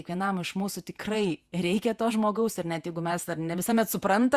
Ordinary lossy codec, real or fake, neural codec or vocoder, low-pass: Opus, 64 kbps; fake; vocoder, 48 kHz, 128 mel bands, Vocos; 14.4 kHz